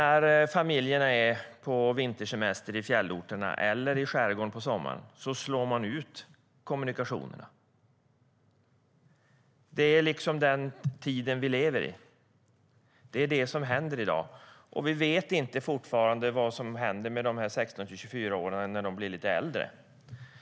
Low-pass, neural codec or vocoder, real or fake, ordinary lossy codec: none; none; real; none